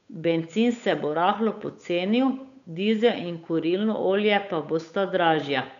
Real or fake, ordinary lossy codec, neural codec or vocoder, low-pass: fake; none; codec, 16 kHz, 8 kbps, FunCodec, trained on Chinese and English, 25 frames a second; 7.2 kHz